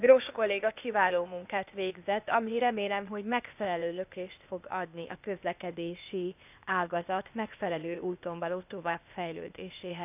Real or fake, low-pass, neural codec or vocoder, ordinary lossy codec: fake; 3.6 kHz; codec, 16 kHz, 0.8 kbps, ZipCodec; none